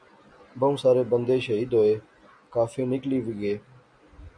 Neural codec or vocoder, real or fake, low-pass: none; real; 9.9 kHz